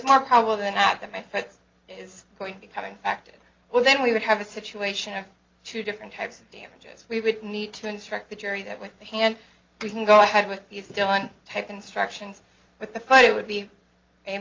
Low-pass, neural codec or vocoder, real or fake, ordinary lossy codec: 7.2 kHz; none; real; Opus, 24 kbps